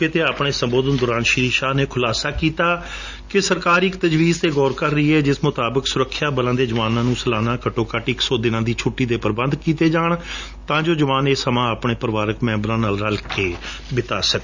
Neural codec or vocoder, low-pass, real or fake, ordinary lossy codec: none; 7.2 kHz; real; Opus, 64 kbps